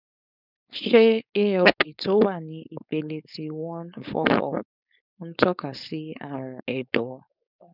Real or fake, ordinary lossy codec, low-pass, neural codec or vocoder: fake; none; 5.4 kHz; codec, 16 kHz, 4.8 kbps, FACodec